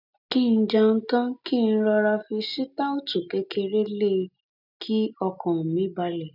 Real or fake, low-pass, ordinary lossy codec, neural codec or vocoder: real; 5.4 kHz; none; none